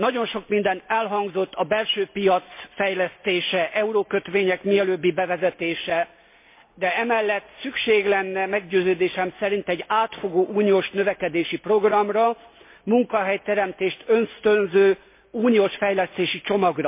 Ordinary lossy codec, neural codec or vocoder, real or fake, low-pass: MP3, 24 kbps; none; real; 3.6 kHz